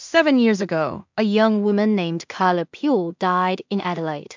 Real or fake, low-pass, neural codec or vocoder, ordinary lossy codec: fake; 7.2 kHz; codec, 16 kHz in and 24 kHz out, 0.4 kbps, LongCat-Audio-Codec, two codebook decoder; MP3, 64 kbps